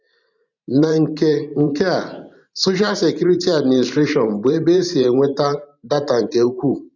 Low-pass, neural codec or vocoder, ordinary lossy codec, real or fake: 7.2 kHz; vocoder, 44.1 kHz, 128 mel bands every 512 samples, BigVGAN v2; none; fake